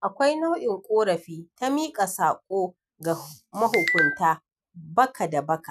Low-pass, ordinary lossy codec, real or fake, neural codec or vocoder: 14.4 kHz; none; real; none